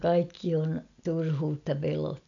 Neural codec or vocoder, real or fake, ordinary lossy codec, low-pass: none; real; none; 7.2 kHz